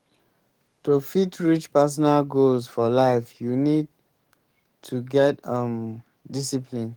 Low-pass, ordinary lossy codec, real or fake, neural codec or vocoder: 19.8 kHz; Opus, 24 kbps; fake; codec, 44.1 kHz, 7.8 kbps, DAC